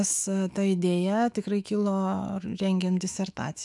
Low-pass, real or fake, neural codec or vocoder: 10.8 kHz; fake; autoencoder, 48 kHz, 128 numbers a frame, DAC-VAE, trained on Japanese speech